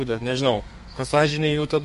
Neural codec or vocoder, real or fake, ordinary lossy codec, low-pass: codec, 32 kHz, 1.9 kbps, SNAC; fake; MP3, 48 kbps; 14.4 kHz